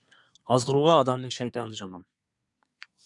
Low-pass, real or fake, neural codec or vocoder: 10.8 kHz; fake; codec, 24 kHz, 1 kbps, SNAC